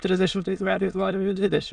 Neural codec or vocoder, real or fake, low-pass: autoencoder, 22.05 kHz, a latent of 192 numbers a frame, VITS, trained on many speakers; fake; 9.9 kHz